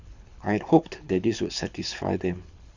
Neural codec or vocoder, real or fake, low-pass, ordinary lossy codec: codec, 24 kHz, 6 kbps, HILCodec; fake; 7.2 kHz; none